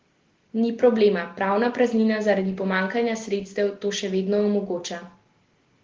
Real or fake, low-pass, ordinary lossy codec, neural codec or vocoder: real; 7.2 kHz; Opus, 16 kbps; none